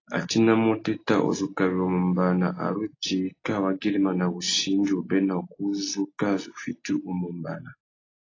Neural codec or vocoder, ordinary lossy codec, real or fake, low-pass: none; AAC, 32 kbps; real; 7.2 kHz